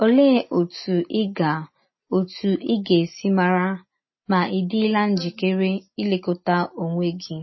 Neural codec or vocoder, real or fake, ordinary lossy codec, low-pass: none; real; MP3, 24 kbps; 7.2 kHz